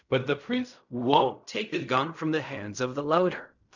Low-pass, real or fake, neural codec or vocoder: 7.2 kHz; fake; codec, 16 kHz in and 24 kHz out, 0.4 kbps, LongCat-Audio-Codec, fine tuned four codebook decoder